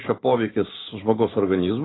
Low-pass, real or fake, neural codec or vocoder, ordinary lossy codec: 7.2 kHz; real; none; AAC, 16 kbps